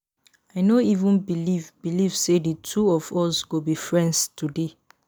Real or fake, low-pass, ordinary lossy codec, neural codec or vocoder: real; none; none; none